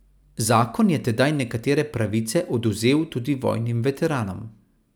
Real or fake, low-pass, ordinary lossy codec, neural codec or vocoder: real; none; none; none